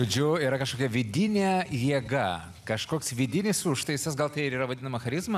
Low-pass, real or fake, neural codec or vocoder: 14.4 kHz; real; none